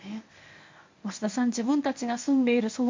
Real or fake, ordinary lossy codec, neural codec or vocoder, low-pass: fake; MP3, 48 kbps; codec, 24 kHz, 0.9 kbps, WavTokenizer, medium speech release version 1; 7.2 kHz